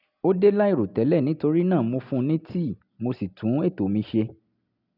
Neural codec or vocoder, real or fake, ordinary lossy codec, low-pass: none; real; none; 5.4 kHz